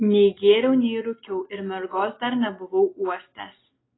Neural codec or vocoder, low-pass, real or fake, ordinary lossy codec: none; 7.2 kHz; real; AAC, 16 kbps